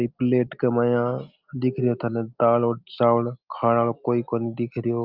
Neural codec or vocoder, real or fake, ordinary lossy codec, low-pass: none; real; Opus, 24 kbps; 5.4 kHz